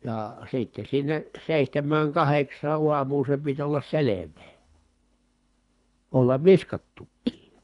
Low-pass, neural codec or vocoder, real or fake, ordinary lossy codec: 10.8 kHz; codec, 24 kHz, 3 kbps, HILCodec; fake; none